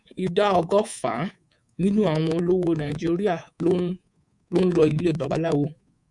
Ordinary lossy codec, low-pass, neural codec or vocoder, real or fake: MP3, 96 kbps; 10.8 kHz; codec, 24 kHz, 3.1 kbps, DualCodec; fake